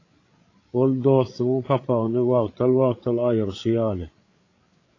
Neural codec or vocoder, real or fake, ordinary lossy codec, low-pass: codec, 16 kHz, 8 kbps, FreqCodec, larger model; fake; AAC, 32 kbps; 7.2 kHz